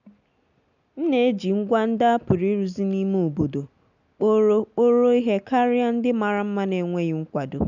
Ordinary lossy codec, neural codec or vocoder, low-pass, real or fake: none; none; 7.2 kHz; real